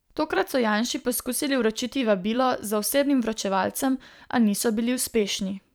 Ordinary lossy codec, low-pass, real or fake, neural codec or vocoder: none; none; fake; vocoder, 44.1 kHz, 128 mel bands every 512 samples, BigVGAN v2